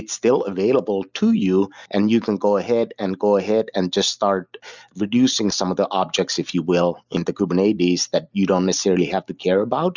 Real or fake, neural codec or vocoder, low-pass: real; none; 7.2 kHz